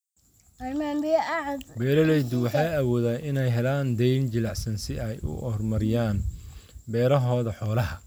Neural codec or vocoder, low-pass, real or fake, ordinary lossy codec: none; none; real; none